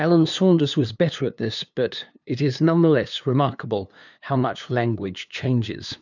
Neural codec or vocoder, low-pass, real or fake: codec, 16 kHz, 2 kbps, FunCodec, trained on LibriTTS, 25 frames a second; 7.2 kHz; fake